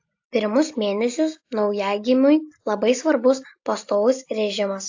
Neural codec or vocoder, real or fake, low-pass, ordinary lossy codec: none; real; 7.2 kHz; AAC, 48 kbps